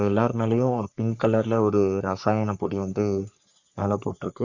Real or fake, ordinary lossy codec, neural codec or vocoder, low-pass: fake; Opus, 64 kbps; codec, 44.1 kHz, 3.4 kbps, Pupu-Codec; 7.2 kHz